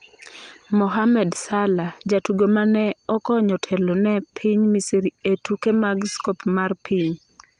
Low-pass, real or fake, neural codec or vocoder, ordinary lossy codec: 9.9 kHz; real; none; Opus, 32 kbps